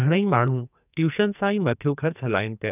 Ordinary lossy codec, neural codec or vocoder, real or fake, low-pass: none; codec, 44.1 kHz, 2.6 kbps, SNAC; fake; 3.6 kHz